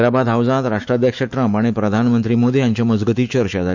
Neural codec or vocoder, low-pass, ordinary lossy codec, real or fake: autoencoder, 48 kHz, 128 numbers a frame, DAC-VAE, trained on Japanese speech; 7.2 kHz; none; fake